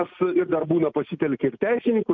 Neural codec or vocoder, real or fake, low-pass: none; real; 7.2 kHz